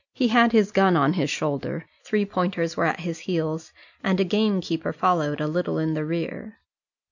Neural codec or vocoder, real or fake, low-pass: none; real; 7.2 kHz